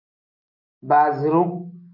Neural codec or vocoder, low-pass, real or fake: none; 5.4 kHz; real